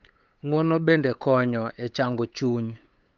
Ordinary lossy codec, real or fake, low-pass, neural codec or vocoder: Opus, 24 kbps; fake; 7.2 kHz; codec, 16 kHz, 8 kbps, FunCodec, trained on LibriTTS, 25 frames a second